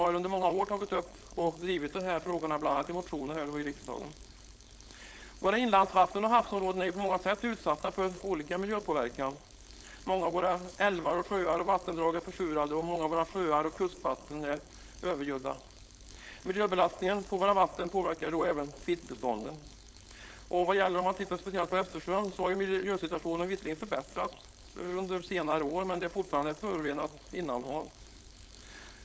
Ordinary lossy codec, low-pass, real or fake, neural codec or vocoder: none; none; fake; codec, 16 kHz, 4.8 kbps, FACodec